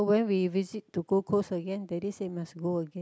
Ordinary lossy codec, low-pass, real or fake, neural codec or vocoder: none; none; real; none